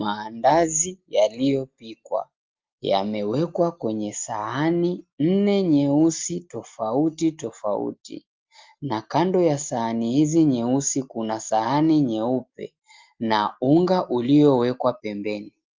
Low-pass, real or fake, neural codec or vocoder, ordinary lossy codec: 7.2 kHz; real; none; Opus, 32 kbps